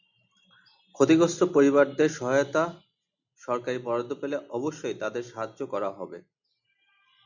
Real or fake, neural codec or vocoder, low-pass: real; none; 7.2 kHz